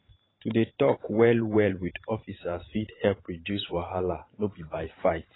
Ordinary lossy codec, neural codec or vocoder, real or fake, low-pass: AAC, 16 kbps; vocoder, 44.1 kHz, 128 mel bands every 512 samples, BigVGAN v2; fake; 7.2 kHz